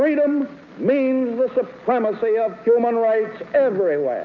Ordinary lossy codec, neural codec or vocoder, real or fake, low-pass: MP3, 64 kbps; none; real; 7.2 kHz